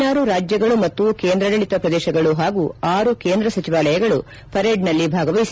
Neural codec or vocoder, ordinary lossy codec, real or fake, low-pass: none; none; real; none